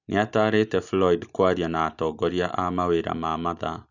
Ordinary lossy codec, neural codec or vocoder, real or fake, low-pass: none; none; real; 7.2 kHz